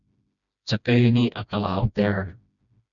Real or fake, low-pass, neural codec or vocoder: fake; 7.2 kHz; codec, 16 kHz, 1 kbps, FreqCodec, smaller model